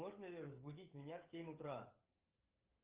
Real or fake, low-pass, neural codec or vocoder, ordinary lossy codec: fake; 3.6 kHz; codec, 16 kHz, 16 kbps, FreqCodec, smaller model; Opus, 32 kbps